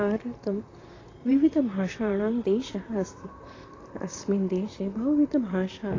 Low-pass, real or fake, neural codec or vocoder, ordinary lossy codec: 7.2 kHz; fake; vocoder, 44.1 kHz, 128 mel bands, Pupu-Vocoder; AAC, 32 kbps